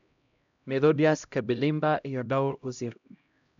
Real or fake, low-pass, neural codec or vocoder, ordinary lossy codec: fake; 7.2 kHz; codec, 16 kHz, 0.5 kbps, X-Codec, HuBERT features, trained on LibriSpeech; none